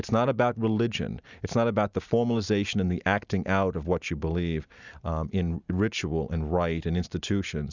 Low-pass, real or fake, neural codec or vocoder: 7.2 kHz; real; none